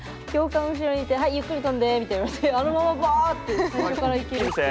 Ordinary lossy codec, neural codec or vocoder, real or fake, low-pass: none; none; real; none